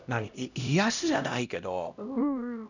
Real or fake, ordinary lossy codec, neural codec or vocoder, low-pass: fake; none; codec, 16 kHz, 1 kbps, X-Codec, HuBERT features, trained on LibriSpeech; 7.2 kHz